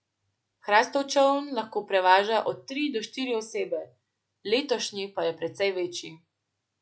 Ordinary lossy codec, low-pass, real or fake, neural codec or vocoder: none; none; real; none